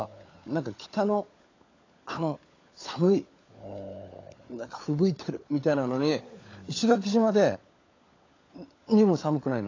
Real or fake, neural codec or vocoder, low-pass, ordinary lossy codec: fake; codec, 16 kHz, 16 kbps, FunCodec, trained on LibriTTS, 50 frames a second; 7.2 kHz; AAC, 32 kbps